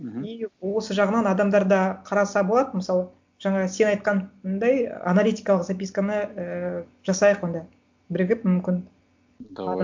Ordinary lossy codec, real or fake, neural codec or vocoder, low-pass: MP3, 64 kbps; real; none; 7.2 kHz